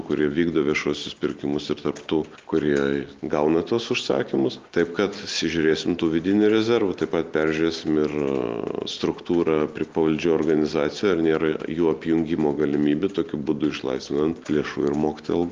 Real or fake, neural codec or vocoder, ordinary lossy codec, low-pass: real; none; Opus, 32 kbps; 7.2 kHz